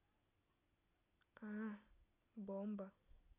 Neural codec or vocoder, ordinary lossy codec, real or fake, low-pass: none; none; real; 3.6 kHz